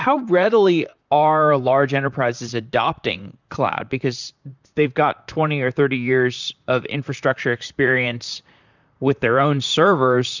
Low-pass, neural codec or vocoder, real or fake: 7.2 kHz; vocoder, 44.1 kHz, 128 mel bands, Pupu-Vocoder; fake